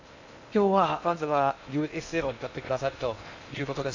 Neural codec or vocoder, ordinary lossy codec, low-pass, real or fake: codec, 16 kHz in and 24 kHz out, 0.6 kbps, FocalCodec, streaming, 2048 codes; AAC, 48 kbps; 7.2 kHz; fake